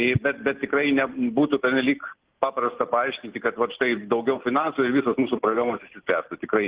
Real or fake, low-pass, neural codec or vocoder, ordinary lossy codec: real; 3.6 kHz; none; Opus, 16 kbps